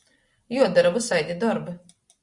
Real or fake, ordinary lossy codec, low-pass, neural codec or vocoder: real; Opus, 64 kbps; 10.8 kHz; none